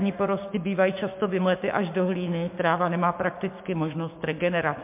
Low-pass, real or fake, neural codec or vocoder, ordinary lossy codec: 3.6 kHz; fake; codec, 16 kHz, 6 kbps, DAC; MP3, 32 kbps